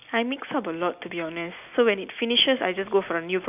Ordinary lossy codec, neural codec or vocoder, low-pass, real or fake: none; none; 3.6 kHz; real